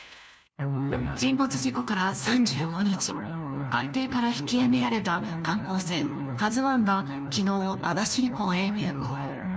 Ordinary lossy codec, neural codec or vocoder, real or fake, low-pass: none; codec, 16 kHz, 1 kbps, FunCodec, trained on LibriTTS, 50 frames a second; fake; none